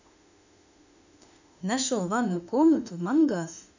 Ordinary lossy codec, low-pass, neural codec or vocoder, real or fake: none; 7.2 kHz; autoencoder, 48 kHz, 32 numbers a frame, DAC-VAE, trained on Japanese speech; fake